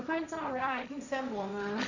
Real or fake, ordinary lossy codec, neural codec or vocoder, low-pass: fake; none; codec, 16 kHz, 1.1 kbps, Voila-Tokenizer; 7.2 kHz